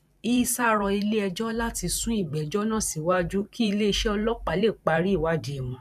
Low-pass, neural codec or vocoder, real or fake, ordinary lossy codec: 14.4 kHz; vocoder, 44.1 kHz, 128 mel bands every 512 samples, BigVGAN v2; fake; none